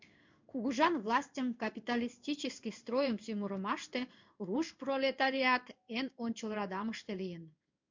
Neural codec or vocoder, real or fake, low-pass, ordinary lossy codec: codec, 16 kHz in and 24 kHz out, 1 kbps, XY-Tokenizer; fake; 7.2 kHz; MP3, 64 kbps